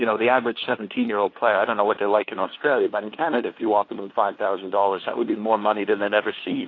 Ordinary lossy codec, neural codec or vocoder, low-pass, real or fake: AAC, 32 kbps; codec, 16 kHz, 2 kbps, FunCodec, trained on LibriTTS, 25 frames a second; 7.2 kHz; fake